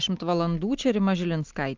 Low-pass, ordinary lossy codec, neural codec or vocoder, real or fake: 7.2 kHz; Opus, 32 kbps; none; real